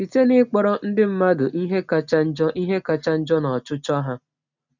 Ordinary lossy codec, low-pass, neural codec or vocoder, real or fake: none; 7.2 kHz; none; real